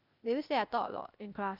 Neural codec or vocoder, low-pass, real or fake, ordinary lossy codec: codec, 16 kHz, 0.8 kbps, ZipCodec; 5.4 kHz; fake; none